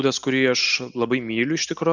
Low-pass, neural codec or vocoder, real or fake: 7.2 kHz; none; real